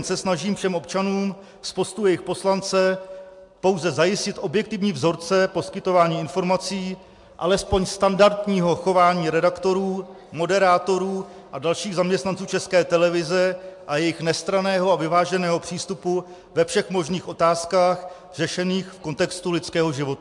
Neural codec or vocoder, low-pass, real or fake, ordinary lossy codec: none; 10.8 kHz; real; MP3, 64 kbps